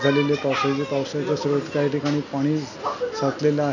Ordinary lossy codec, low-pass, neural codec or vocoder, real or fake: none; 7.2 kHz; none; real